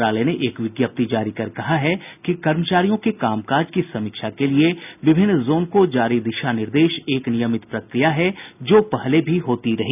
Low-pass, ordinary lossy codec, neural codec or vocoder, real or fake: 3.6 kHz; none; none; real